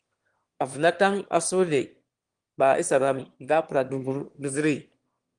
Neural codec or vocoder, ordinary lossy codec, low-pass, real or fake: autoencoder, 22.05 kHz, a latent of 192 numbers a frame, VITS, trained on one speaker; Opus, 24 kbps; 9.9 kHz; fake